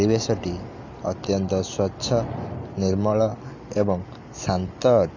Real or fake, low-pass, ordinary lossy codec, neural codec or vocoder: real; 7.2 kHz; AAC, 48 kbps; none